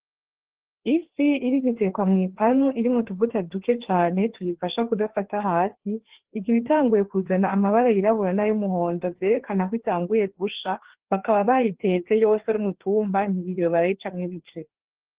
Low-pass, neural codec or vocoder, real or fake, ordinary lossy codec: 3.6 kHz; codec, 16 kHz, 2 kbps, FreqCodec, larger model; fake; Opus, 16 kbps